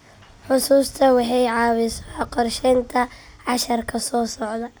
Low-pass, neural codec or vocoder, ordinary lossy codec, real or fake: none; none; none; real